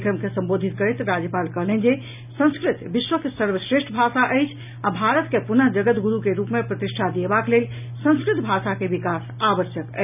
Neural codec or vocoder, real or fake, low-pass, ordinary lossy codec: none; real; 3.6 kHz; none